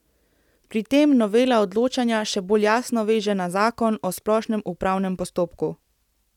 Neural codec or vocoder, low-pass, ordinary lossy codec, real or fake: none; 19.8 kHz; none; real